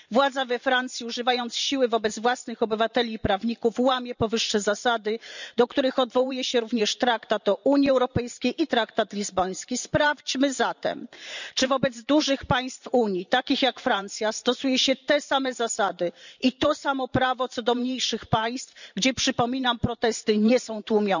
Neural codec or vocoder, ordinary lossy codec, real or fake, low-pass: vocoder, 44.1 kHz, 128 mel bands every 512 samples, BigVGAN v2; none; fake; 7.2 kHz